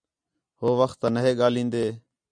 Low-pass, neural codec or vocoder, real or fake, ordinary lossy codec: 9.9 kHz; none; real; MP3, 96 kbps